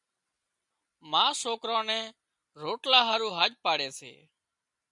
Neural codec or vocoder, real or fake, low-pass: none; real; 10.8 kHz